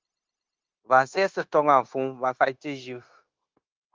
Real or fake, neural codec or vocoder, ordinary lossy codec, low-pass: fake; codec, 16 kHz, 0.9 kbps, LongCat-Audio-Codec; Opus, 32 kbps; 7.2 kHz